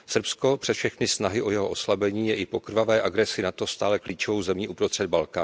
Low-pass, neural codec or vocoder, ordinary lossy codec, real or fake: none; none; none; real